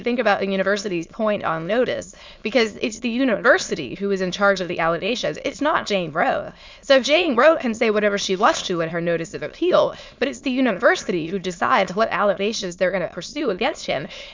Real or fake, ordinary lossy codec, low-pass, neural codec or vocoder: fake; MP3, 64 kbps; 7.2 kHz; autoencoder, 22.05 kHz, a latent of 192 numbers a frame, VITS, trained on many speakers